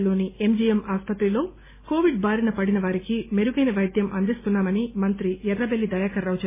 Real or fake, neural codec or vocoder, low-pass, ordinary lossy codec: real; none; 3.6 kHz; MP3, 16 kbps